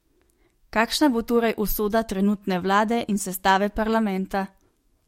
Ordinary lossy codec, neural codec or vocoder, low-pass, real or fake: MP3, 64 kbps; codec, 44.1 kHz, 7.8 kbps, DAC; 19.8 kHz; fake